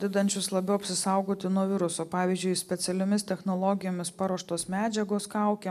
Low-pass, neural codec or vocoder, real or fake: 14.4 kHz; none; real